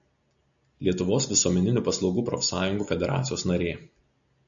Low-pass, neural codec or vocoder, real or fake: 7.2 kHz; none; real